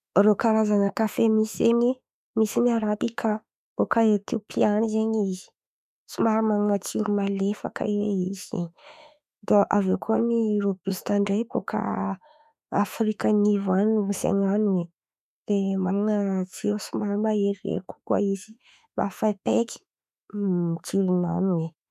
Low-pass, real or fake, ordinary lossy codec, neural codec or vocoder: 14.4 kHz; fake; none; autoencoder, 48 kHz, 32 numbers a frame, DAC-VAE, trained on Japanese speech